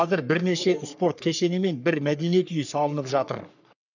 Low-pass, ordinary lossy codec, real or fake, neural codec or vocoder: 7.2 kHz; none; fake; codec, 44.1 kHz, 3.4 kbps, Pupu-Codec